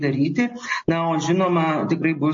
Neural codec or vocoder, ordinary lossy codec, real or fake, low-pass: none; MP3, 32 kbps; real; 7.2 kHz